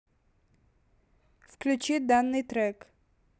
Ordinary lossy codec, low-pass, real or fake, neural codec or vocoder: none; none; real; none